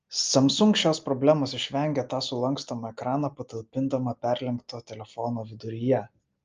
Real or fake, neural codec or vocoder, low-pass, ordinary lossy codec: real; none; 7.2 kHz; Opus, 24 kbps